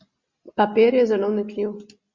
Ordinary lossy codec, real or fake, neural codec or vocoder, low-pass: Opus, 64 kbps; real; none; 7.2 kHz